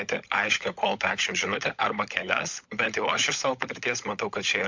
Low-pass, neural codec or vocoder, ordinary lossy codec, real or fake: 7.2 kHz; codec, 16 kHz, 16 kbps, FunCodec, trained on LibriTTS, 50 frames a second; MP3, 64 kbps; fake